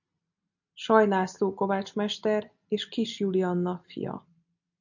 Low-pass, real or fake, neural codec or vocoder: 7.2 kHz; real; none